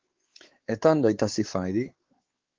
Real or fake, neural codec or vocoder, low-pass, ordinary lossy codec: fake; codec, 16 kHz, 4 kbps, X-Codec, WavLM features, trained on Multilingual LibriSpeech; 7.2 kHz; Opus, 16 kbps